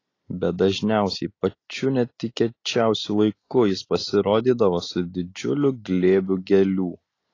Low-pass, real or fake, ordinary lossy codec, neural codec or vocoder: 7.2 kHz; real; AAC, 32 kbps; none